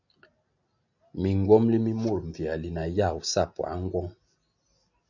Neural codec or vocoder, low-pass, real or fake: none; 7.2 kHz; real